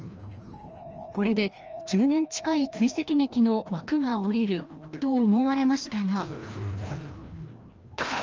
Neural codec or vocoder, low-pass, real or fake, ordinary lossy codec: codec, 16 kHz, 1 kbps, FreqCodec, larger model; 7.2 kHz; fake; Opus, 24 kbps